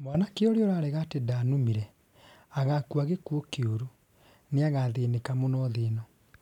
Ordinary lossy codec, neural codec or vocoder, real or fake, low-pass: none; none; real; 19.8 kHz